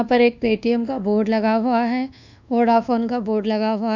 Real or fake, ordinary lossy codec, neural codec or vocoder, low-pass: fake; none; codec, 24 kHz, 1.2 kbps, DualCodec; 7.2 kHz